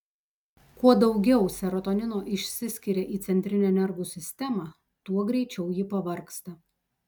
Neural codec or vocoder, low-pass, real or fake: none; 19.8 kHz; real